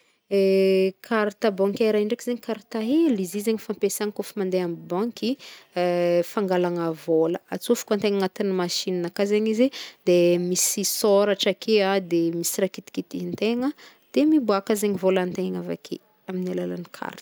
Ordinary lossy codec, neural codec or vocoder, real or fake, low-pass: none; none; real; none